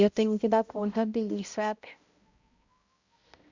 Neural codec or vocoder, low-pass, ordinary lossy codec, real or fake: codec, 16 kHz, 0.5 kbps, X-Codec, HuBERT features, trained on balanced general audio; 7.2 kHz; Opus, 64 kbps; fake